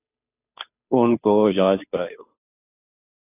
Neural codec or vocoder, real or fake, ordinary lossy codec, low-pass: codec, 16 kHz, 2 kbps, FunCodec, trained on Chinese and English, 25 frames a second; fake; AAC, 24 kbps; 3.6 kHz